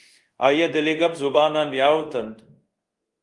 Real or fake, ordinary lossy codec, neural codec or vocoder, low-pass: fake; Opus, 24 kbps; codec, 24 kHz, 0.5 kbps, DualCodec; 10.8 kHz